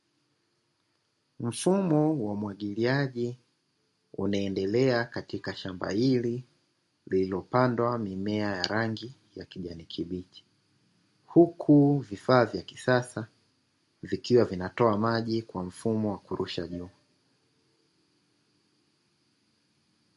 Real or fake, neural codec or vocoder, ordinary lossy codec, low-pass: real; none; MP3, 48 kbps; 14.4 kHz